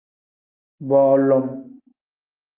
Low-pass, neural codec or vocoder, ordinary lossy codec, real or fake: 3.6 kHz; none; Opus, 24 kbps; real